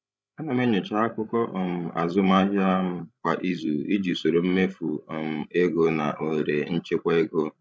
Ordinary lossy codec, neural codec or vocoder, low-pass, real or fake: none; codec, 16 kHz, 16 kbps, FreqCodec, larger model; none; fake